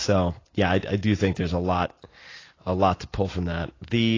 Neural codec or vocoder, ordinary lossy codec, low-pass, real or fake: none; AAC, 32 kbps; 7.2 kHz; real